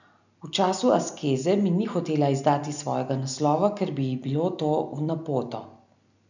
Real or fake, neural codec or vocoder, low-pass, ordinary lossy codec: real; none; 7.2 kHz; none